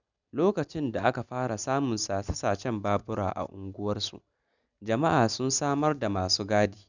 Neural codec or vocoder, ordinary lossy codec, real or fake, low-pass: none; none; real; 7.2 kHz